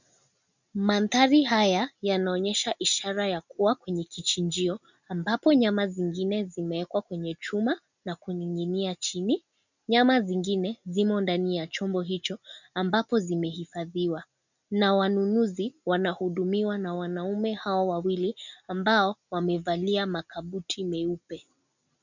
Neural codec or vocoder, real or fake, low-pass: none; real; 7.2 kHz